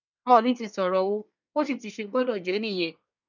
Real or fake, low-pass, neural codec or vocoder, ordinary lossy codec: fake; 7.2 kHz; codec, 24 kHz, 1 kbps, SNAC; none